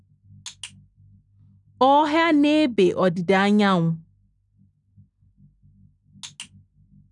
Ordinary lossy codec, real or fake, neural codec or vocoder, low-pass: none; real; none; 10.8 kHz